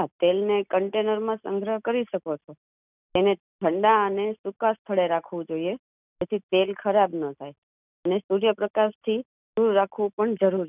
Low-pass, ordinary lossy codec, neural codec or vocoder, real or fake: 3.6 kHz; none; none; real